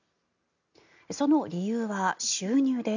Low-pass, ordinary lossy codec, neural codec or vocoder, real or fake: 7.2 kHz; none; none; real